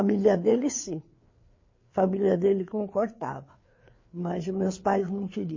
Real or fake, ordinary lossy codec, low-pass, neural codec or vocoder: fake; MP3, 32 kbps; 7.2 kHz; codec, 16 kHz, 4 kbps, FreqCodec, larger model